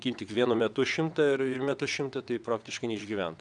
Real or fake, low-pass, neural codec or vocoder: fake; 9.9 kHz; vocoder, 22.05 kHz, 80 mel bands, WaveNeXt